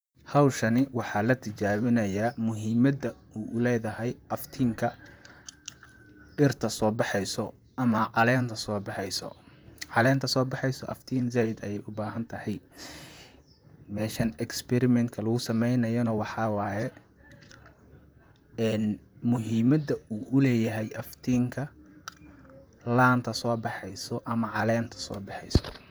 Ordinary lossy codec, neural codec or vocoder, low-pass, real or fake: none; vocoder, 44.1 kHz, 128 mel bands, Pupu-Vocoder; none; fake